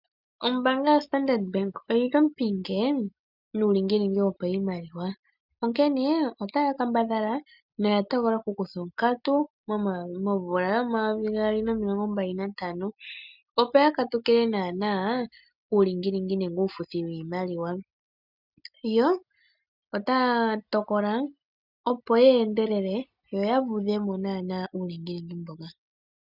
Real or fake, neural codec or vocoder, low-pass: real; none; 5.4 kHz